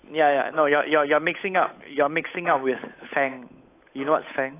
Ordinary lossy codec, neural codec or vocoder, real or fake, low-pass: AAC, 24 kbps; codec, 16 kHz, 8 kbps, FunCodec, trained on Chinese and English, 25 frames a second; fake; 3.6 kHz